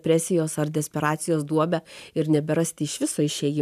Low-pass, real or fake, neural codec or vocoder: 14.4 kHz; fake; vocoder, 44.1 kHz, 128 mel bands every 512 samples, BigVGAN v2